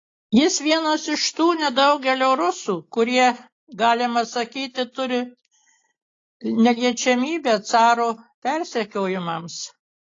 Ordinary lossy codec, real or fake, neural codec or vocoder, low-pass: AAC, 32 kbps; real; none; 7.2 kHz